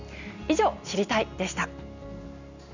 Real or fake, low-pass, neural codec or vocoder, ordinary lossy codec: real; 7.2 kHz; none; none